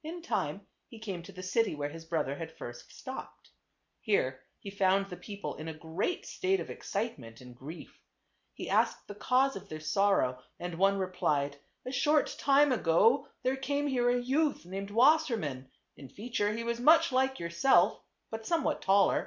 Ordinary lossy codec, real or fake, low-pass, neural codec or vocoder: MP3, 64 kbps; real; 7.2 kHz; none